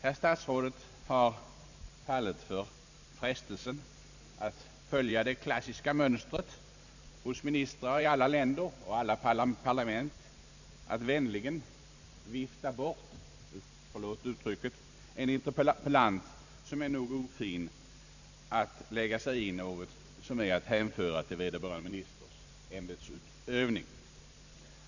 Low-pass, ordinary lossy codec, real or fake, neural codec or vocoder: 7.2 kHz; none; fake; vocoder, 44.1 kHz, 128 mel bands every 512 samples, BigVGAN v2